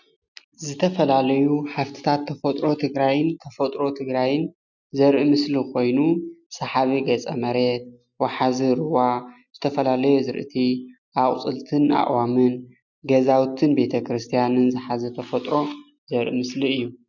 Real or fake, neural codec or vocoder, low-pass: real; none; 7.2 kHz